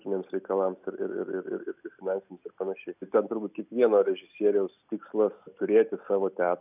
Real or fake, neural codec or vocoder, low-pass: real; none; 3.6 kHz